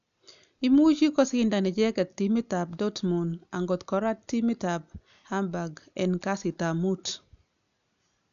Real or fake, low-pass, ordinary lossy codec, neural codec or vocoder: real; 7.2 kHz; none; none